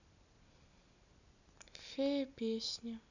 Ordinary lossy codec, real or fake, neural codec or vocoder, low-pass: none; real; none; 7.2 kHz